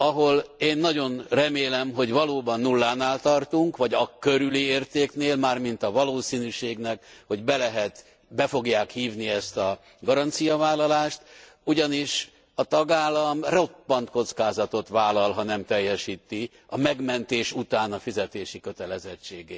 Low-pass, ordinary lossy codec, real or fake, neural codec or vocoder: none; none; real; none